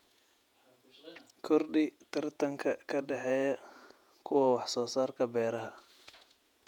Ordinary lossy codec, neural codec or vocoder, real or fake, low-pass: none; vocoder, 48 kHz, 128 mel bands, Vocos; fake; 19.8 kHz